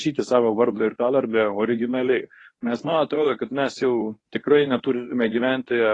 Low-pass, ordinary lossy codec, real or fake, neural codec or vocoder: 10.8 kHz; AAC, 32 kbps; fake; codec, 24 kHz, 0.9 kbps, WavTokenizer, medium speech release version 1